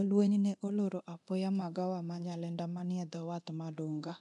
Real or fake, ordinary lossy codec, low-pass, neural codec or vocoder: fake; none; 10.8 kHz; codec, 24 kHz, 0.9 kbps, DualCodec